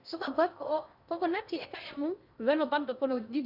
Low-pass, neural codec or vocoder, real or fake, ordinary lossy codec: 5.4 kHz; codec, 16 kHz in and 24 kHz out, 0.8 kbps, FocalCodec, streaming, 65536 codes; fake; none